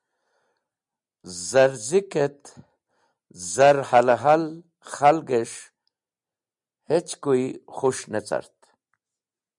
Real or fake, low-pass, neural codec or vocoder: real; 10.8 kHz; none